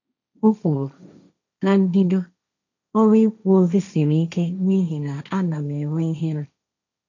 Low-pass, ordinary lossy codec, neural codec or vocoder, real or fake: 7.2 kHz; none; codec, 16 kHz, 1.1 kbps, Voila-Tokenizer; fake